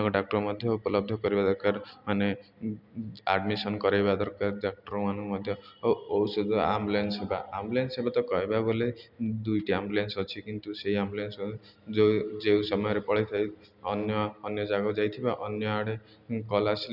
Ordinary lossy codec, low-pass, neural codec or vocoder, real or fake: none; 5.4 kHz; none; real